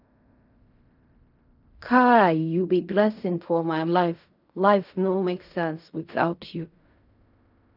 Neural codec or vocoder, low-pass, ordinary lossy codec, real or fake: codec, 16 kHz in and 24 kHz out, 0.4 kbps, LongCat-Audio-Codec, fine tuned four codebook decoder; 5.4 kHz; none; fake